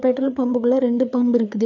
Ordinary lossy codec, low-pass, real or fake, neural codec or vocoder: none; 7.2 kHz; fake; codec, 16 kHz, 4 kbps, FreqCodec, larger model